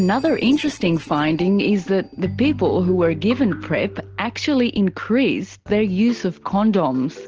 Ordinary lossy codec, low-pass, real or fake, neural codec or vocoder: Opus, 16 kbps; 7.2 kHz; real; none